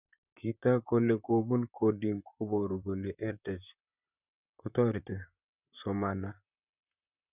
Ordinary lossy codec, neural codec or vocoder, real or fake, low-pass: none; vocoder, 44.1 kHz, 128 mel bands, Pupu-Vocoder; fake; 3.6 kHz